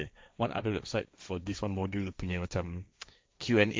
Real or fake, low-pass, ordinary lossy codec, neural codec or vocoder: fake; none; none; codec, 16 kHz, 1.1 kbps, Voila-Tokenizer